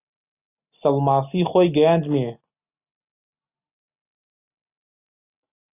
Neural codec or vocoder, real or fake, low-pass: none; real; 3.6 kHz